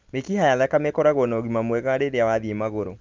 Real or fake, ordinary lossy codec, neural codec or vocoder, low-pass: real; Opus, 32 kbps; none; 7.2 kHz